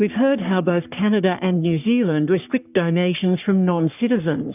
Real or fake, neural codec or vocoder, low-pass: fake; codec, 44.1 kHz, 3.4 kbps, Pupu-Codec; 3.6 kHz